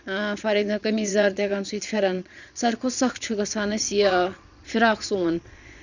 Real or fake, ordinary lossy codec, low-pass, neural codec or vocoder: fake; none; 7.2 kHz; vocoder, 22.05 kHz, 80 mel bands, Vocos